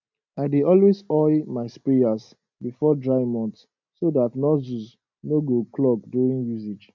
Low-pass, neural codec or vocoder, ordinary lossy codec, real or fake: 7.2 kHz; none; MP3, 64 kbps; real